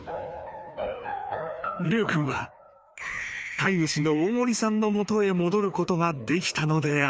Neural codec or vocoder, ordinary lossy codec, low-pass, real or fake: codec, 16 kHz, 2 kbps, FreqCodec, larger model; none; none; fake